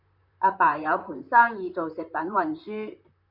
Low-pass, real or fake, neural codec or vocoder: 5.4 kHz; fake; vocoder, 44.1 kHz, 128 mel bands, Pupu-Vocoder